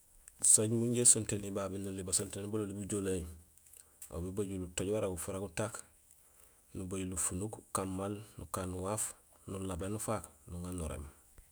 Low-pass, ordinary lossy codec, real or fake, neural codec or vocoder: none; none; fake; autoencoder, 48 kHz, 128 numbers a frame, DAC-VAE, trained on Japanese speech